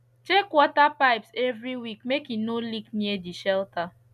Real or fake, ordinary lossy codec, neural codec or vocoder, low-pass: real; none; none; 14.4 kHz